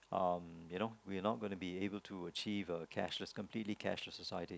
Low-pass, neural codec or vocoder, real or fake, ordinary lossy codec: none; none; real; none